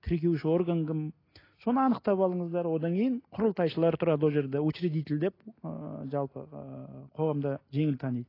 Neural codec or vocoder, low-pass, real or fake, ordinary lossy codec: none; 5.4 kHz; real; AAC, 24 kbps